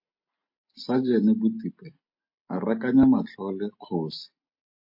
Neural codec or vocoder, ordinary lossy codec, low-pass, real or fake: none; MP3, 32 kbps; 5.4 kHz; real